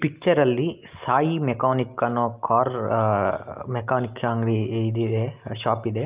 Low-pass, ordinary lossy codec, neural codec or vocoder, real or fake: 3.6 kHz; Opus, 32 kbps; none; real